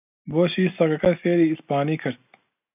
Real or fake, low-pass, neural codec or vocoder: real; 3.6 kHz; none